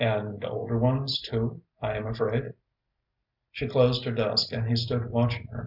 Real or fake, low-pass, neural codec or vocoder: real; 5.4 kHz; none